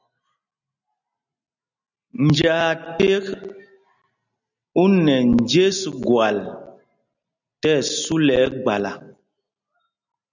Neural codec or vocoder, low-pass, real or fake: none; 7.2 kHz; real